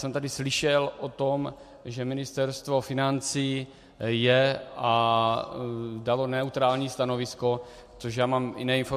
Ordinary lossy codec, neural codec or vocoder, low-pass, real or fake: MP3, 64 kbps; none; 14.4 kHz; real